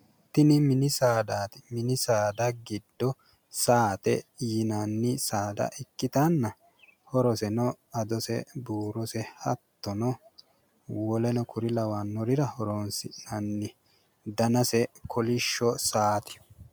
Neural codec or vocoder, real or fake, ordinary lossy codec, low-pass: none; real; MP3, 96 kbps; 19.8 kHz